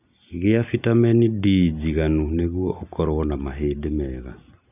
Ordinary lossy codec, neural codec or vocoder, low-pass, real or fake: none; none; 3.6 kHz; real